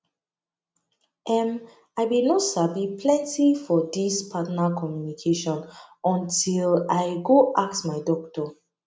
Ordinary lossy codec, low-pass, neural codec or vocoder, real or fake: none; none; none; real